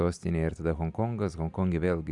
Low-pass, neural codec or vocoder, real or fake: 10.8 kHz; none; real